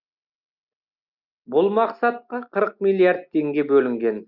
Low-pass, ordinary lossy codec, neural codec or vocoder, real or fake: 5.4 kHz; Opus, 64 kbps; none; real